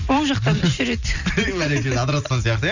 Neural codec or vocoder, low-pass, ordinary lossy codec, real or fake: none; 7.2 kHz; none; real